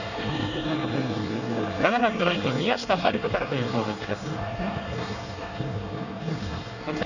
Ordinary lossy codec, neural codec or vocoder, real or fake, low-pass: none; codec, 24 kHz, 1 kbps, SNAC; fake; 7.2 kHz